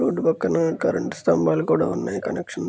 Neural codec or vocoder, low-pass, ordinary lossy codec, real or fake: none; none; none; real